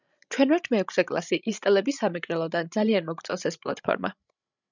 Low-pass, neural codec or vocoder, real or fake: 7.2 kHz; codec, 16 kHz, 16 kbps, FreqCodec, larger model; fake